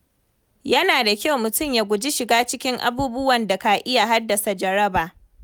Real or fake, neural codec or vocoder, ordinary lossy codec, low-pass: real; none; none; none